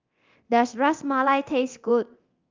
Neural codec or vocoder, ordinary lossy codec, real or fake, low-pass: codec, 24 kHz, 0.9 kbps, DualCodec; Opus, 32 kbps; fake; 7.2 kHz